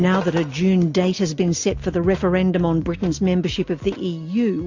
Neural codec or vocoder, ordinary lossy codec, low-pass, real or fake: none; AAC, 48 kbps; 7.2 kHz; real